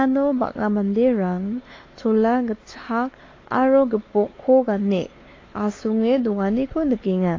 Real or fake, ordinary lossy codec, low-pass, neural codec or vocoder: fake; AAC, 32 kbps; 7.2 kHz; codec, 16 kHz, 4 kbps, X-Codec, WavLM features, trained on Multilingual LibriSpeech